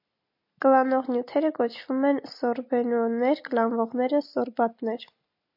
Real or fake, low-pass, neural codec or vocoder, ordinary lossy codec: real; 5.4 kHz; none; MP3, 32 kbps